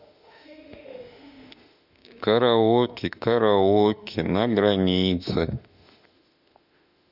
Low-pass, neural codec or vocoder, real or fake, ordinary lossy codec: 5.4 kHz; autoencoder, 48 kHz, 32 numbers a frame, DAC-VAE, trained on Japanese speech; fake; none